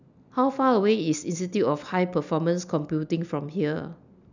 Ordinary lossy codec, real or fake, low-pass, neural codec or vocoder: none; real; 7.2 kHz; none